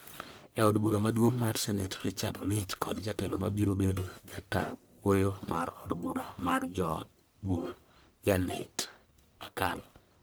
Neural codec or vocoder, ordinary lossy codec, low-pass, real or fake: codec, 44.1 kHz, 1.7 kbps, Pupu-Codec; none; none; fake